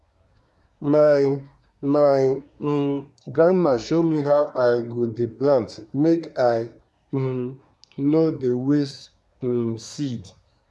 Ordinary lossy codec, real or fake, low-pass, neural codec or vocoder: none; fake; none; codec, 24 kHz, 1 kbps, SNAC